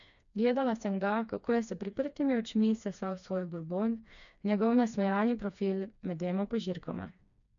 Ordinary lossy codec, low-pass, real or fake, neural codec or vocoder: none; 7.2 kHz; fake; codec, 16 kHz, 2 kbps, FreqCodec, smaller model